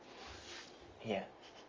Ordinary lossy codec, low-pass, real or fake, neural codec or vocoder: Opus, 32 kbps; 7.2 kHz; real; none